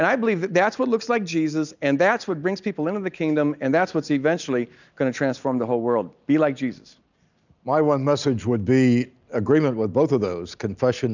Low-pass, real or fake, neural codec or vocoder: 7.2 kHz; real; none